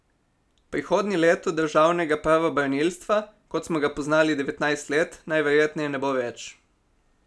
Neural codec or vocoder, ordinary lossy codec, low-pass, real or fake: none; none; none; real